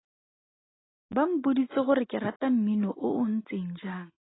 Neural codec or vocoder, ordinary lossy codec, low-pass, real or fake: none; AAC, 16 kbps; 7.2 kHz; real